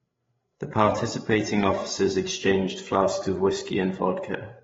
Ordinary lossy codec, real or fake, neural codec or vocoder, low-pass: AAC, 24 kbps; fake; codec, 16 kHz, 8 kbps, FreqCodec, larger model; 7.2 kHz